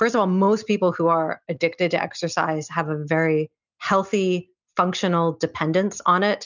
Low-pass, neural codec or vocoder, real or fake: 7.2 kHz; none; real